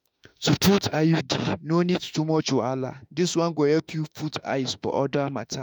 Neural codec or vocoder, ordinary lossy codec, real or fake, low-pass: autoencoder, 48 kHz, 32 numbers a frame, DAC-VAE, trained on Japanese speech; none; fake; none